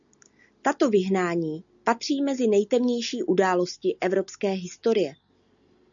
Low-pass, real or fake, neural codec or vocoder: 7.2 kHz; real; none